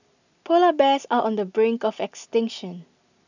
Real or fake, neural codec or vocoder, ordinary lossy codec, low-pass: real; none; none; 7.2 kHz